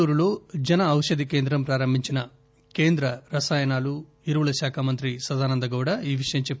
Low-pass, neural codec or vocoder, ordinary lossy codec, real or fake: none; none; none; real